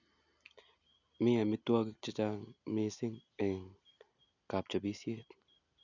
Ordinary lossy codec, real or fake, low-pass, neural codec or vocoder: none; real; 7.2 kHz; none